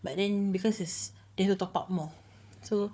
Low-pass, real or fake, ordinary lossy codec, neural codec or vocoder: none; fake; none; codec, 16 kHz, 4 kbps, FunCodec, trained on Chinese and English, 50 frames a second